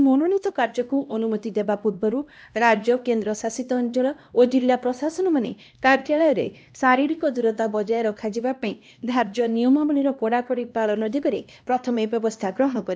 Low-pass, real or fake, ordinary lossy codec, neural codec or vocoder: none; fake; none; codec, 16 kHz, 1 kbps, X-Codec, HuBERT features, trained on LibriSpeech